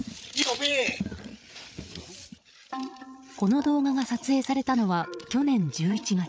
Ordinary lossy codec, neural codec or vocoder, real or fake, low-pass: none; codec, 16 kHz, 8 kbps, FreqCodec, larger model; fake; none